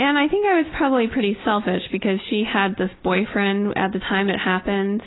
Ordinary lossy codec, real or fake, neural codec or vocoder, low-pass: AAC, 16 kbps; real; none; 7.2 kHz